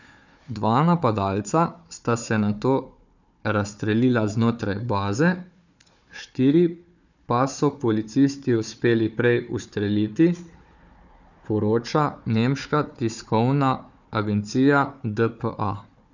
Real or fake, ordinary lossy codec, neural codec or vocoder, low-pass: fake; none; codec, 16 kHz, 4 kbps, FunCodec, trained on Chinese and English, 50 frames a second; 7.2 kHz